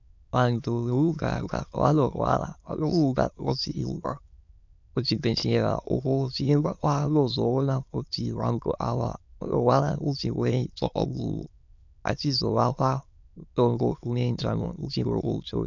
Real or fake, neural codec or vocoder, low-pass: fake; autoencoder, 22.05 kHz, a latent of 192 numbers a frame, VITS, trained on many speakers; 7.2 kHz